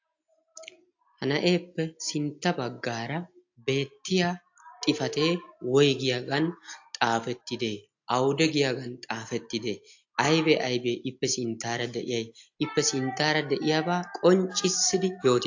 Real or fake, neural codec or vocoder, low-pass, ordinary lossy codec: real; none; 7.2 kHz; AAC, 48 kbps